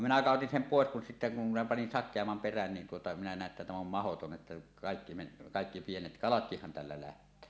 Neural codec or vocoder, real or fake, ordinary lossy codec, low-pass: none; real; none; none